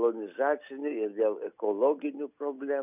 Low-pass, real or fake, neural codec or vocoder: 3.6 kHz; real; none